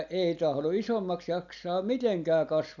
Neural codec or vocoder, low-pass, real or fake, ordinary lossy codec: none; 7.2 kHz; real; none